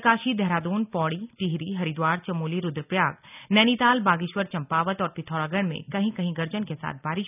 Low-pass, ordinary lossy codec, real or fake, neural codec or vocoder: 3.6 kHz; none; real; none